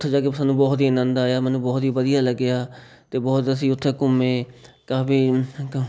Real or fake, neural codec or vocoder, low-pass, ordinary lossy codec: real; none; none; none